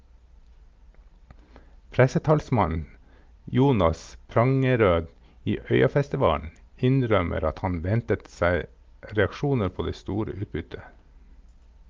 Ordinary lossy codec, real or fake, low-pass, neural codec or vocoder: Opus, 24 kbps; real; 7.2 kHz; none